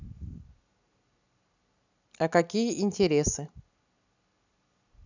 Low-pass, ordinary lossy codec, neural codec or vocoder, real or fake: 7.2 kHz; none; none; real